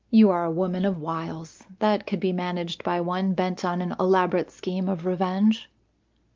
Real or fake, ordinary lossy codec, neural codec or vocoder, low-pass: real; Opus, 32 kbps; none; 7.2 kHz